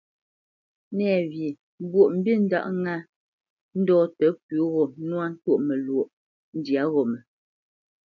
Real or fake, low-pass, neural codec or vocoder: real; 7.2 kHz; none